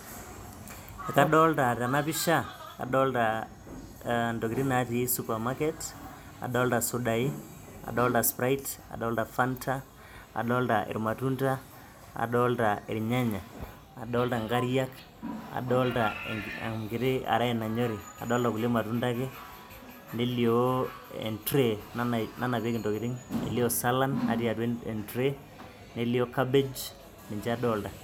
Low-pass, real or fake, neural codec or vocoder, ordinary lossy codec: 19.8 kHz; real; none; none